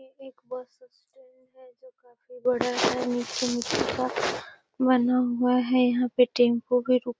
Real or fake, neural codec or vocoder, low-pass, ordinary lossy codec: real; none; none; none